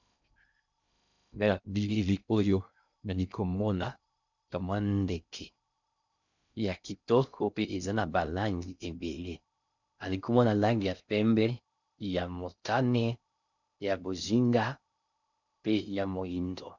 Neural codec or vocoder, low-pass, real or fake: codec, 16 kHz in and 24 kHz out, 0.6 kbps, FocalCodec, streaming, 2048 codes; 7.2 kHz; fake